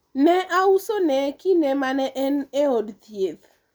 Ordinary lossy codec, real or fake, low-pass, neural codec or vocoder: none; real; none; none